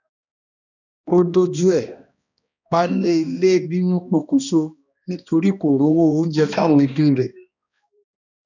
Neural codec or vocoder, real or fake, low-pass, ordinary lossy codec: codec, 16 kHz, 1 kbps, X-Codec, HuBERT features, trained on balanced general audio; fake; 7.2 kHz; none